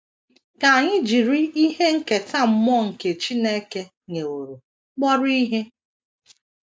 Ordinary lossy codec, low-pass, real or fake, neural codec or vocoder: none; none; real; none